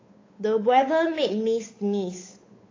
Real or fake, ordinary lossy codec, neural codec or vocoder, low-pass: fake; AAC, 32 kbps; codec, 16 kHz, 8 kbps, FunCodec, trained on Chinese and English, 25 frames a second; 7.2 kHz